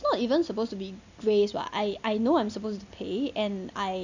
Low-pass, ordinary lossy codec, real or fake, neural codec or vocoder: 7.2 kHz; none; real; none